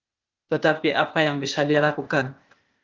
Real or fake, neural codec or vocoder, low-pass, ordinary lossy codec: fake; codec, 16 kHz, 0.8 kbps, ZipCodec; 7.2 kHz; Opus, 32 kbps